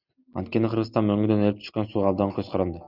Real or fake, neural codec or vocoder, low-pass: real; none; 5.4 kHz